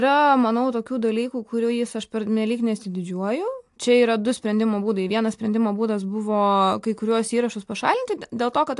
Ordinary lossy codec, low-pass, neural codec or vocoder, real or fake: AAC, 64 kbps; 10.8 kHz; none; real